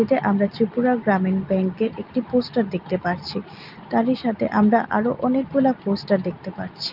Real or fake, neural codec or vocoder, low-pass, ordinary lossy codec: real; none; 5.4 kHz; Opus, 24 kbps